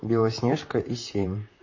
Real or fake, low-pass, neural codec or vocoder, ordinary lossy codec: fake; 7.2 kHz; vocoder, 44.1 kHz, 128 mel bands, Pupu-Vocoder; MP3, 32 kbps